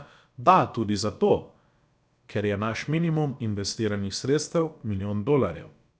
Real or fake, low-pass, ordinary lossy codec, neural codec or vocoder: fake; none; none; codec, 16 kHz, about 1 kbps, DyCAST, with the encoder's durations